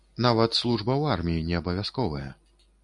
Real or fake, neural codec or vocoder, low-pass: real; none; 10.8 kHz